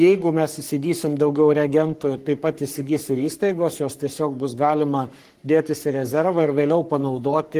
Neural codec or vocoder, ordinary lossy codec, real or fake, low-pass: codec, 44.1 kHz, 3.4 kbps, Pupu-Codec; Opus, 24 kbps; fake; 14.4 kHz